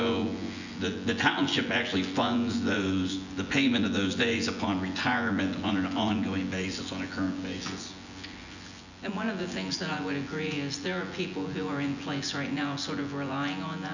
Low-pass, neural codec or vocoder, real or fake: 7.2 kHz; vocoder, 24 kHz, 100 mel bands, Vocos; fake